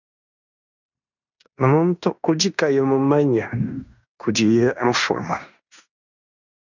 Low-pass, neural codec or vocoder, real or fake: 7.2 kHz; codec, 16 kHz in and 24 kHz out, 0.9 kbps, LongCat-Audio-Codec, fine tuned four codebook decoder; fake